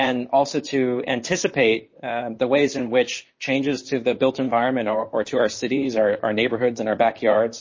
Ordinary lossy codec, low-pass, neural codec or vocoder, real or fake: MP3, 32 kbps; 7.2 kHz; vocoder, 44.1 kHz, 128 mel bands, Pupu-Vocoder; fake